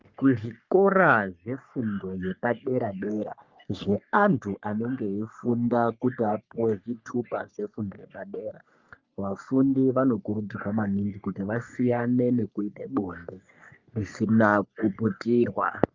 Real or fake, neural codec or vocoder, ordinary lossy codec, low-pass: fake; codec, 44.1 kHz, 3.4 kbps, Pupu-Codec; Opus, 24 kbps; 7.2 kHz